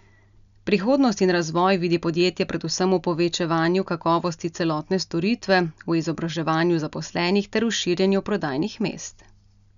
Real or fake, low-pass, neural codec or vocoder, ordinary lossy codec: real; 7.2 kHz; none; none